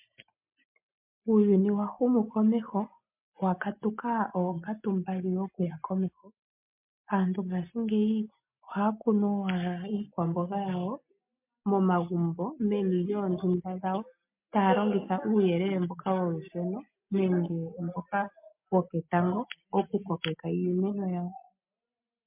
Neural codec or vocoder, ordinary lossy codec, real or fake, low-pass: none; MP3, 32 kbps; real; 3.6 kHz